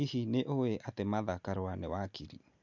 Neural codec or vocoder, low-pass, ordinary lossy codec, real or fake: none; 7.2 kHz; none; real